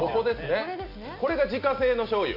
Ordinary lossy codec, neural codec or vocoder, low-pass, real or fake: none; none; 5.4 kHz; real